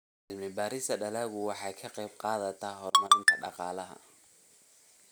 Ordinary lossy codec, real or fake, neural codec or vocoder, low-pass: none; real; none; none